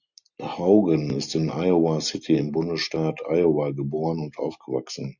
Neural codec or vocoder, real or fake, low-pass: none; real; 7.2 kHz